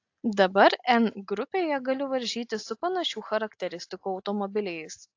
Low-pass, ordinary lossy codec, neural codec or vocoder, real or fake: 7.2 kHz; AAC, 48 kbps; none; real